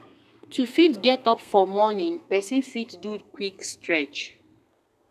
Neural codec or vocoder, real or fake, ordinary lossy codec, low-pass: codec, 32 kHz, 1.9 kbps, SNAC; fake; none; 14.4 kHz